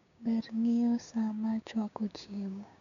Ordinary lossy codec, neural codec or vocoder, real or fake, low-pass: none; codec, 16 kHz, 6 kbps, DAC; fake; 7.2 kHz